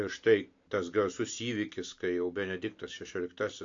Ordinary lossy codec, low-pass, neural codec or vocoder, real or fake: AAC, 48 kbps; 7.2 kHz; none; real